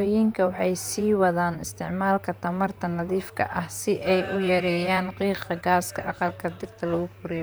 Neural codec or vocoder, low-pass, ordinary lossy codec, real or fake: vocoder, 44.1 kHz, 128 mel bands, Pupu-Vocoder; none; none; fake